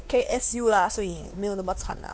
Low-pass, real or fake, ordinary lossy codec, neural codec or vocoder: none; fake; none; codec, 16 kHz, 2 kbps, X-Codec, WavLM features, trained on Multilingual LibriSpeech